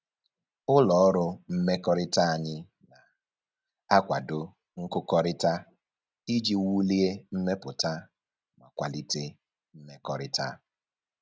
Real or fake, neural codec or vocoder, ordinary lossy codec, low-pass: real; none; none; none